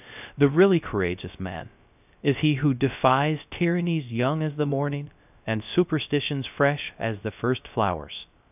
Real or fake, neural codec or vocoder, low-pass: fake; codec, 16 kHz, 0.3 kbps, FocalCodec; 3.6 kHz